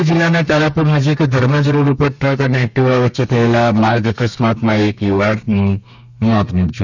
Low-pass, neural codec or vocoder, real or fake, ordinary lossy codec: 7.2 kHz; codec, 32 kHz, 1.9 kbps, SNAC; fake; none